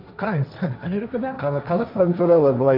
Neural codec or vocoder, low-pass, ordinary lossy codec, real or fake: codec, 16 kHz, 1.1 kbps, Voila-Tokenizer; 5.4 kHz; none; fake